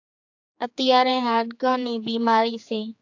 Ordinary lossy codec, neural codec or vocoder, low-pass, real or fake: AAC, 48 kbps; codec, 16 kHz, 4 kbps, X-Codec, HuBERT features, trained on general audio; 7.2 kHz; fake